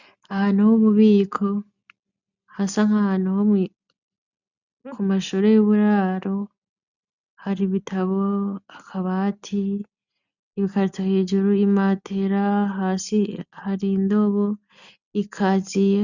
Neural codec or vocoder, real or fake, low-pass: none; real; 7.2 kHz